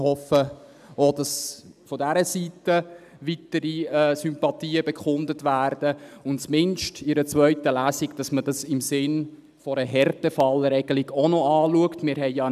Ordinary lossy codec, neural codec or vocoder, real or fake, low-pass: none; none; real; 14.4 kHz